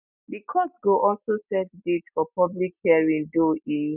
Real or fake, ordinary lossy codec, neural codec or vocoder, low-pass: real; none; none; 3.6 kHz